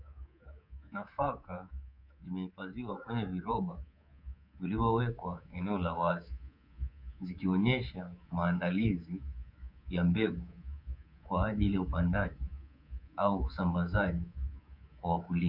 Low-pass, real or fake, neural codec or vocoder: 5.4 kHz; fake; codec, 24 kHz, 3.1 kbps, DualCodec